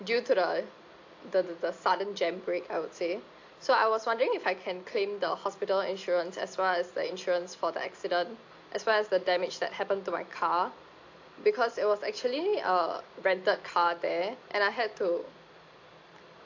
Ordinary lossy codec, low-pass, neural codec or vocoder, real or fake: AAC, 48 kbps; 7.2 kHz; none; real